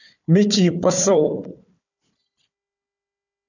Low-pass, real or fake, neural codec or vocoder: 7.2 kHz; fake; codec, 16 kHz, 4 kbps, FunCodec, trained on Chinese and English, 50 frames a second